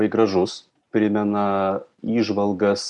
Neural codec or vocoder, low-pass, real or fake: none; 10.8 kHz; real